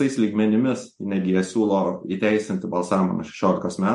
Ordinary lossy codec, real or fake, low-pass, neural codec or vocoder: MP3, 48 kbps; real; 14.4 kHz; none